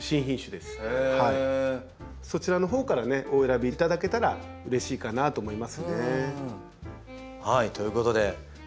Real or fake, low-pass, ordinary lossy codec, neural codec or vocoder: real; none; none; none